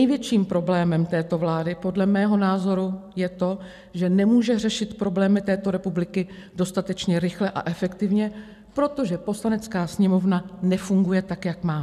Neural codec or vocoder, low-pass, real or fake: none; 14.4 kHz; real